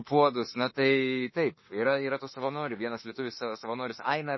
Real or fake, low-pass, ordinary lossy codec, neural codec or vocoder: fake; 7.2 kHz; MP3, 24 kbps; autoencoder, 48 kHz, 32 numbers a frame, DAC-VAE, trained on Japanese speech